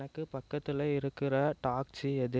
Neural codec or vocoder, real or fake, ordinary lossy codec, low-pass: none; real; none; none